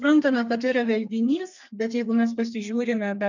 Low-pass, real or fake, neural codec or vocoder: 7.2 kHz; fake; codec, 32 kHz, 1.9 kbps, SNAC